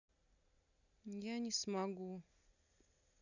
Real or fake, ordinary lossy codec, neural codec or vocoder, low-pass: real; none; none; 7.2 kHz